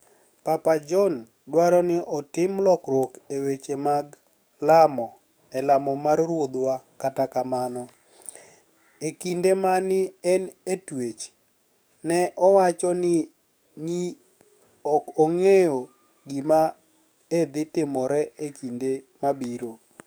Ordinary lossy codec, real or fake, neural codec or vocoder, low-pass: none; fake; codec, 44.1 kHz, 7.8 kbps, DAC; none